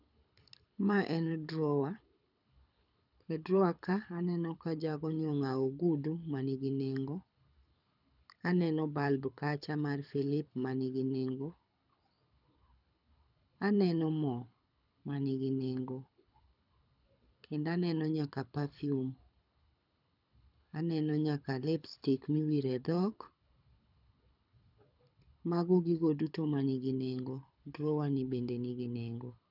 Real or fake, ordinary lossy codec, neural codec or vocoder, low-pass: fake; none; codec, 24 kHz, 6 kbps, HILCodec; 5.4 kHz